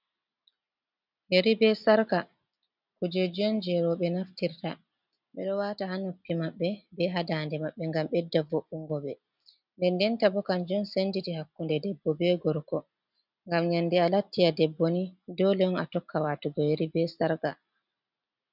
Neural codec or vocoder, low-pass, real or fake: none; 5.4 kHz; real